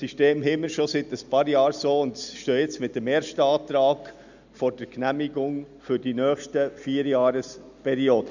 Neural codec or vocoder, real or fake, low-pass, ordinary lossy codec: none; real; 7.2 kHz; none